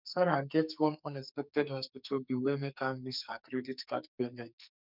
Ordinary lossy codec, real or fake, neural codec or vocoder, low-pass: none; fake; codec, 44.1 kHz, 2.6 kbps, SNAC; 5.4 kHz